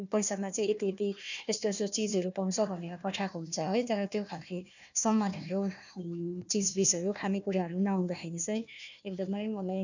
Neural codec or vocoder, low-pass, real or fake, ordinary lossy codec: codec, 16 kHz, 1 kbps, FunCodec, trained on Chinese and English, 50 frames a second; 7.2 kHz; fake; none